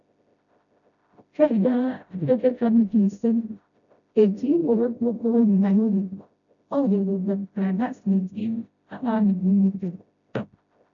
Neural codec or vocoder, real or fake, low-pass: codec, 16 kHz, 0.5 kbps, FreqCodec, smaller model; fake; 7.2 kHz